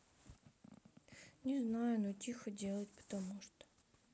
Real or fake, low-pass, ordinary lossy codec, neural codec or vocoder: real; none; none; none